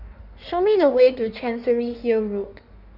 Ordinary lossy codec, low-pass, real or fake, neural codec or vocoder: none; 5.4 kHz; fake; codec, 16 kHz in and 24 kHz out, 1.1 kbps, FireRedTTS-2 codec